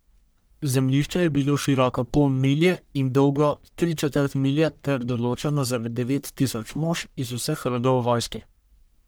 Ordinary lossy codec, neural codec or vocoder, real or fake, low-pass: none; codec, 44.1 kHz, 1.7 kbps, Pupu-Codec; fake; none